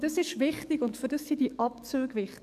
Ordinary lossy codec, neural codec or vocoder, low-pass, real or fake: none; codec, 44.1 kHz, 7.8 kbps, DAC; 14.4 kHz; fake